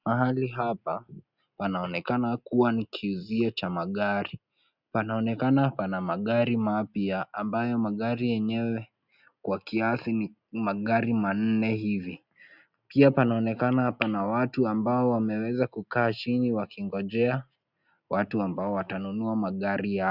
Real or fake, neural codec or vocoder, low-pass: real; none; 5.4 kHz